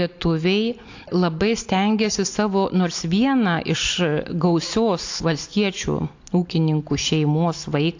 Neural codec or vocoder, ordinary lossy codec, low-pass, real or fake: none; AAC, 48 kbps; 7.2 kHz; real